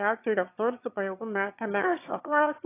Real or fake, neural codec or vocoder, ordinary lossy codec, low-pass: fake; autoencoder, 22.05 kHz, a latent of 192 numbers a frame, VITS, trained on one speaker; AAC, 16 kbps; 3.6 kHz